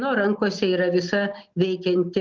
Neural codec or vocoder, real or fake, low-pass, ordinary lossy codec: none; real; 7.2 kHz; Opus, 24 kbps